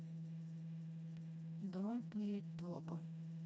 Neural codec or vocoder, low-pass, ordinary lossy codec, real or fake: codec, 16 kHz, 1 kbps, FreqCodec, smaller model; none; none; fake